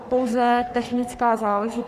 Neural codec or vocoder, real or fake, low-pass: codec, 44.1 kHz, 3.4 kbps, Pupu-Codec; fake; 14.4 kHz